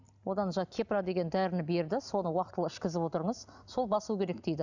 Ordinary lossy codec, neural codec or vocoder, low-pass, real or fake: none; none; 7.2 kHz; real